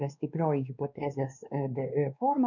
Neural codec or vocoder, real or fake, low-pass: codec, 16 kHz, 2 kbps, X-Codec, WavLM features, trained on Multilingual LibriSpeech; fake; 7.2 kHz